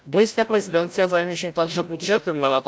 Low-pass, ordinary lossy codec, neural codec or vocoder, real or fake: none; none; codec, 16 kHz, 0.5 kbps, FreqCodec, larger model; fake